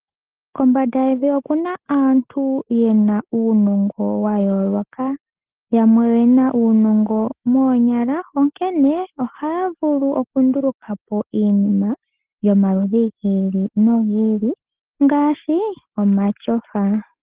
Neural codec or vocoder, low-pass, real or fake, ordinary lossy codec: none; 3.6 kHz; real; Opus, 16 kbps